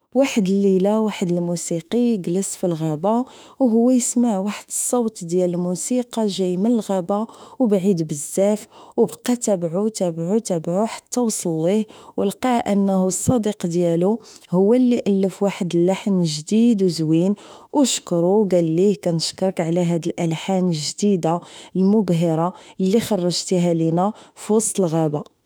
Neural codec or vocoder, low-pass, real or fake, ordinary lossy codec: autoencoder, 48 kHz, 32 numbers a frame, DAC-VAE, trained on Japanese speech; none; fake; none